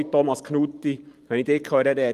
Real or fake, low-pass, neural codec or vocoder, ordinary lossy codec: fake; 14.4 kHz; vocoder, 44.1 kHz, 128 mel bands every 512 samples, BigVGAN v2; Opus, 32 kbps